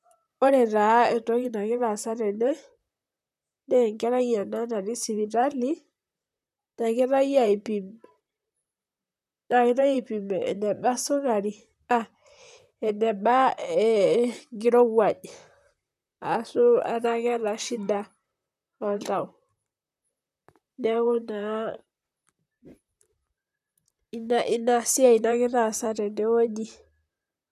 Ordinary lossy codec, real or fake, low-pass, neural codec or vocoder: none; fake; 14.4 kHz; vocoder, 44.1 kHz, 128 mel bands, Pupu-Vocoder